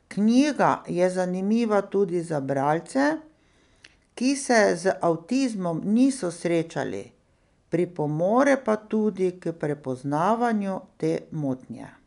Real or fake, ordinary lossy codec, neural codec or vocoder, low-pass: real; none; none; 10.8 kHz